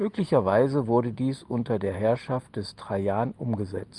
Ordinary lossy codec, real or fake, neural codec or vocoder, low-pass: Opus, 32 kbps; real; none; 10.8 kHz